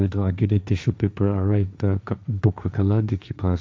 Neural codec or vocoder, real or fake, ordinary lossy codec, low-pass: codec, 16 kHz, 1.1 kbps, Voila-Tokenizer; fake; none; none